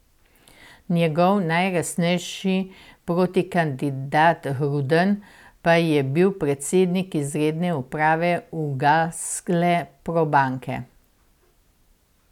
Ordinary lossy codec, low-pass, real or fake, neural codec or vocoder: none; 19.8 kHz; real; none